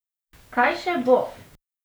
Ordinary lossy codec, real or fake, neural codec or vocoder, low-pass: none; fake; codec, 44.1 kHz, 7.8 kbps, DAC; none